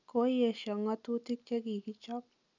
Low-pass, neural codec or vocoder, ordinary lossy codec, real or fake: 7.2 kHz; none; none; real